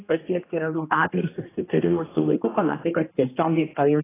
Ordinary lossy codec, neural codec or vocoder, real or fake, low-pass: AAC, 16 kbps; codec, 16 kHz, 1 kbps, X-Codec, HuBERT features, trained on general audio; fake; 3.6 kHz